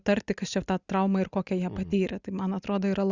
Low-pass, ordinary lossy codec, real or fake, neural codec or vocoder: 7.2 kHz; Opus, 64 kbps; real; none